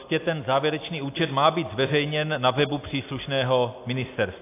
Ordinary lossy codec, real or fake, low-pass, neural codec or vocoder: AAC, 24 kbps; real; 3.6 kHz; none